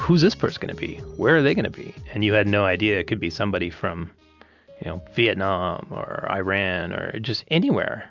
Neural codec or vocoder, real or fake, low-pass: none; real; 7.2 kHz